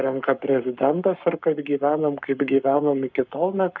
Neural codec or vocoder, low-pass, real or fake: codec, 44.1 kHz, 7.8 kbps, Pupu-Codec; 7.2 kHz; fake